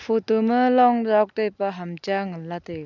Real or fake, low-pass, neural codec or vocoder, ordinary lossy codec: real; 7.2 kHz; none; none